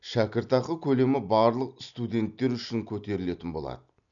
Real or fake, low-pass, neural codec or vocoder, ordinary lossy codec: real; 7.2 kHz; none; none